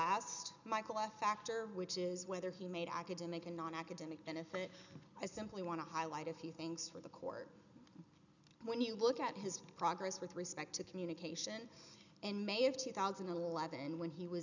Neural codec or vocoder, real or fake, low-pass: none; real; 7.2 kHz